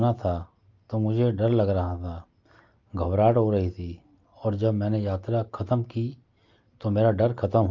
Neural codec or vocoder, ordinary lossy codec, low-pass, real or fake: none; Opus, 24 kbps; 7.2 kHz; real